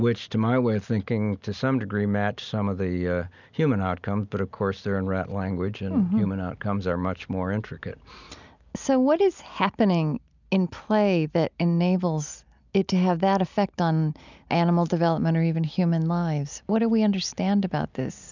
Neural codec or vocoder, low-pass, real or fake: none; 7.2 kHz; real